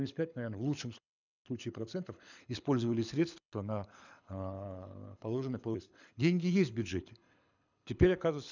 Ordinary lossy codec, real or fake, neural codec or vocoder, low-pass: none; fake; codec, 24 kHz, 6 kbps, HILCodec; 7.2 kHz